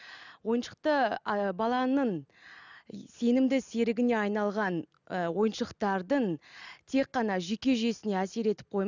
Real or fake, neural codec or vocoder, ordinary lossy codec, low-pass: real; none; none; 7.2 kHz